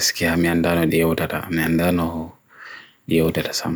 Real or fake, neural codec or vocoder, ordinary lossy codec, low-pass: real; none; none; none